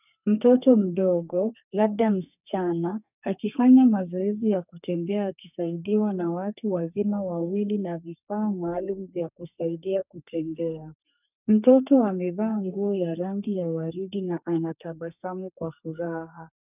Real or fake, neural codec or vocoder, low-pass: fake; codec, 32 kHz, 1.9 kbps, SNAC; 3.6 kHz